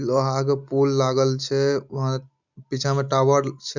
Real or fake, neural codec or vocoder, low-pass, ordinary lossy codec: real; none; 7.2 kHz; none